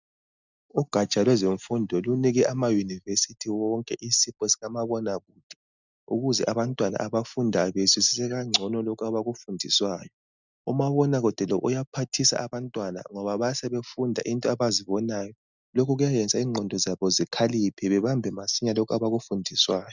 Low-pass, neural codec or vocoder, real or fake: 7.2 kHz; none; real